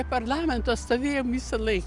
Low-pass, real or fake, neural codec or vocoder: 10.8 kHz; real; none